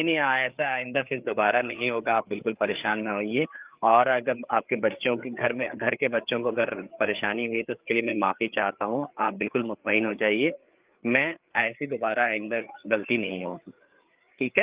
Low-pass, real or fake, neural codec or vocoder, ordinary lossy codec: 3.6 kHz; fake; codec, 16 kHz, 4 kbps, FreqCodec, larger model; Opus, 24 kbps